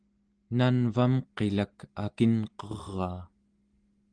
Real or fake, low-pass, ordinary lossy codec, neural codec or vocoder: real; 9.9 kHz; Opus, 24 kbps; none